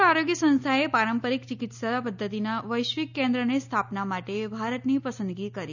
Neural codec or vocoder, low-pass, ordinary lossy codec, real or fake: none; 7.2 kHz; none; real